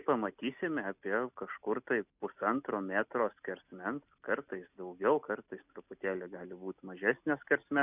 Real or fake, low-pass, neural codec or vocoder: real; 3.6 kHz; none